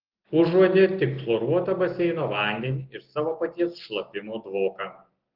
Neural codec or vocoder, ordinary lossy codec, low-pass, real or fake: none; Opus, 16 kbps; 5.4 kHz; real